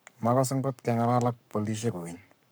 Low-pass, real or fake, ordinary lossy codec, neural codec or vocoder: none; fake; none; codec, 44.1 kHz, 7.8 kbps, Pupu-Codec